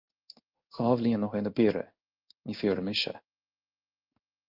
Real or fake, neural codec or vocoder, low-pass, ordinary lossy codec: fake; codec, 16 kHz in and 24 kHz out, 1 kbps, XY-Tokenizer; 5.4 kHz; Opus, 24 kbps